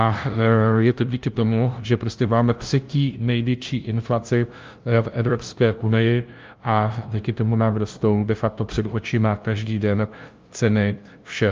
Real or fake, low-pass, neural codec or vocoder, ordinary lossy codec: fake; 7.2 kHz; codec, 16 kHz, 0.5 kbps, FunCodec, trained on LibriTTS, 25 frames a second; Opus, 24 kbps